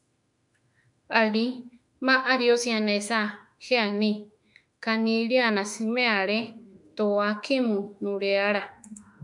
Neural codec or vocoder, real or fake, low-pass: autoencoder, 48 kHz, 32 numbers a frame, DAC-VAE, trained on Japanese speech; fake; 10.8 kHz